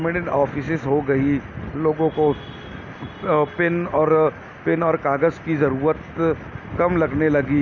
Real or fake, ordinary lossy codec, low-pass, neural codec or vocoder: real; MP3, 48 kbps; 7.2 kHz; none